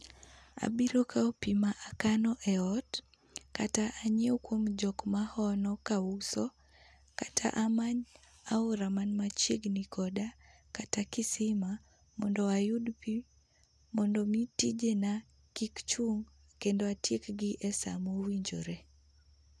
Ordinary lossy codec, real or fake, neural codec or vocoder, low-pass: none; real; none; none